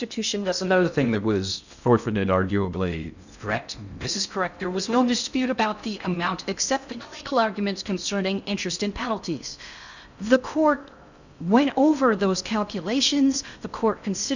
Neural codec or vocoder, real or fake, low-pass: codec, 16 kHz in and 24 kHz out, 0.6 kbps, FocalCodec, streaming, 2048 codes; fake; 7.2 kHz